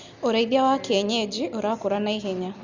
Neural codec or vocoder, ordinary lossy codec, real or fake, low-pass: none; Opus, 64 kbps; real; 7.2 kHz